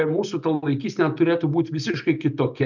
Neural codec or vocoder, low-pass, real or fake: none; 7.2 kHz; real